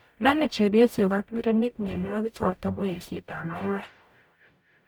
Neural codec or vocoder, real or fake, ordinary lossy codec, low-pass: codec, 44.1 kHz, 0.9 kbps, DAC; fake; none; none